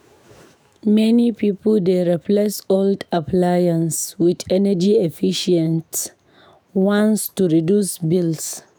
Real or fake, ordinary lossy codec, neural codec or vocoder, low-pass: fake; none; autoencoder, 48 kHz, 128 numbers a frame, DAC-VAE, trained on Japanese speech; none